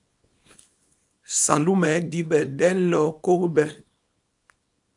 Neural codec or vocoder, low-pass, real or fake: codec, 24 kHz, 0.9 kbps, WavTokenizer, small release; 10.8 kHz; fake